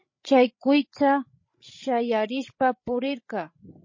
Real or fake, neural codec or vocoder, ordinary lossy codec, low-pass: fake; codec, 16 kHz, 16 kbps, FreqCodec, larger model; MP3, 32 kbps; 7.2 kHz